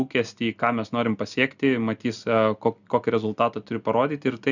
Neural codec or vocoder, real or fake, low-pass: none; real; 7.2 kHz